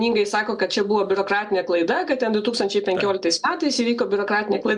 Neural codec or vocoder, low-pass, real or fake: none; 10.8 kHz; real